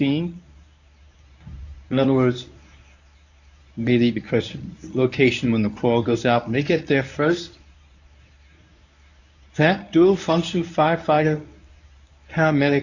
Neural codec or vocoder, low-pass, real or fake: codec, 24 kHz, 0.9 kbps, WavTokenizer, medium speech release version 1; 7.2 kHz; fake